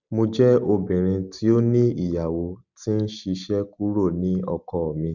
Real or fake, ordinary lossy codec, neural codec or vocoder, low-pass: real; none; none; 7.2 kHz